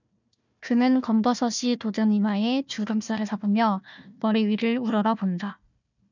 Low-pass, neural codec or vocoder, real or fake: 7.2 kHz; codec, 16 kHz, 1 kbps, FunCodec, trained on Chinese and English, 50 frames a second; fake